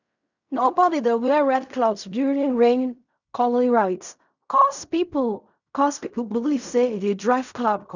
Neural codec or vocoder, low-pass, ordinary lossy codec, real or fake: codec, 16 kHz in and 24 kHz out, 0.4 kbps, LongCat-Audio-Codec, fine tuned four codebook decoder; 7.2 kHz; none; fake